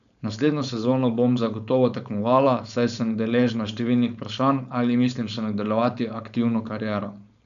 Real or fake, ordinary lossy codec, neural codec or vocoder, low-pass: fake; AAC, 96 kbps; codec, 16 kHz, 4.8 kbps, FACodec; 7.2 kHz